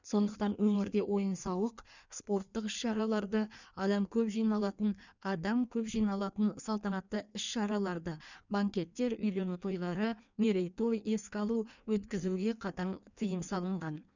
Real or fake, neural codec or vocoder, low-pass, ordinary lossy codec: fake; codec, 16 kHz in and 24 kHz out, 1.1 kbps, FireRedTTS-2 codec; 7.2 kHz; none